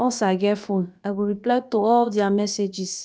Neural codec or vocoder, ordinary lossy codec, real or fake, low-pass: codec, 16 kHz, about 1 kbps, DyCAST, with the encoder's durations; none; fake; none